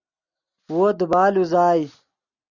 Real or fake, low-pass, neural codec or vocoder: real; 7.2 kHz; none